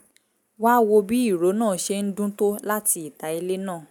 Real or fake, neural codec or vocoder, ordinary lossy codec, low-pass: real; none; none; none